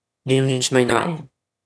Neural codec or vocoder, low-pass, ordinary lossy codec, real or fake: autoencoder, 22.05 kHz, a latent of 192 numbers a frame, VITS, trained on one speaker; none; none; fake